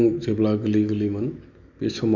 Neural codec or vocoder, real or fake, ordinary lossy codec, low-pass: none; real; none; 7.2 kHz